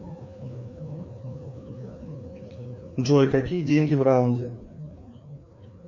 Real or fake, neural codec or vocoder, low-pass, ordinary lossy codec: fake; codec, 16 kHz, 2 kbps, FreqCodec, larger model; 7.2 kHz; MP3, 48 kbps